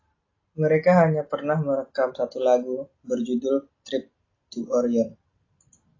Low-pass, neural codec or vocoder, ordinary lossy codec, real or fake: 7.2 kHz; none; AAC, 32 kbps; real